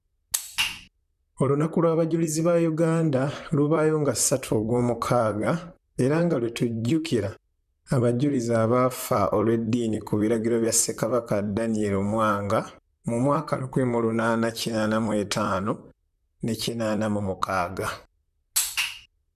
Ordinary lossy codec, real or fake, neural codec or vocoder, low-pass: none; fake; vocoder, 44.1 kHz, 128 mel bands, Pupu-Vocoder; 14.4 kHz